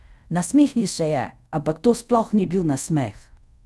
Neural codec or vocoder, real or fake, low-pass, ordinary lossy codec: codec, 24 kHz, 0.5 kbps, DualCodec; fake; none; none